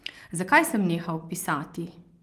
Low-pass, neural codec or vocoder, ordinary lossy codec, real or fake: 14.4 kHz; none; Opus, 24 kbps; real